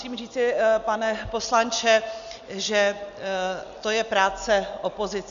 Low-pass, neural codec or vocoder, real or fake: 7.2 kHz; none; real